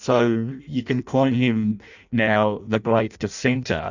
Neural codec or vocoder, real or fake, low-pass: codec, 16 kHz in and 24 kHz out, 0.6 kbps, FireRedTTS-2 codec; fake; 7.2 kHz